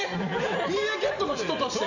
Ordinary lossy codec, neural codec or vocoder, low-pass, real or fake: none; vocoder, 44.1 kHz, 128 mel bands every 512 samples, BigVGAN v2; 7.2 kHz; fake